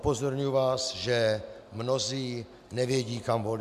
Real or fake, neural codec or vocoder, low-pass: real; none; 14.4 kHz